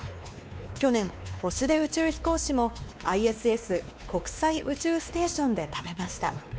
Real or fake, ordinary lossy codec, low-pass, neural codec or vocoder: fake; none; none; codec, 16 kHz, 2 kbps, X-Codec, WavLM features, trained on Multilingual LibriSpeech